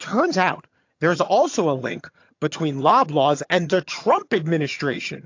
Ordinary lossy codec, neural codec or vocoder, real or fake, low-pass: AAC, 48 kbps; vocoder, 22.05 kHz, 80 mel bands, HiFi-GAN; fake; 7.2 kHz